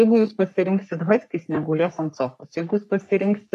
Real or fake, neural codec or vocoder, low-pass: fake; codec, 44.1 kHz, 3.4 kbps, Pupu-Codec; 14.4 kHz